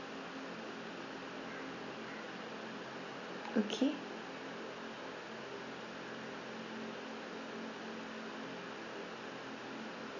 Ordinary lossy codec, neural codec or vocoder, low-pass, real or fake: none; none; 7.2 kHz; real